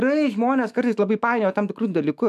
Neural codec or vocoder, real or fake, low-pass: autoencoder, 48 kHz, 128 numbers a frame, DAC-VAE, trained on Japanese speech; fake; 14.4 kHz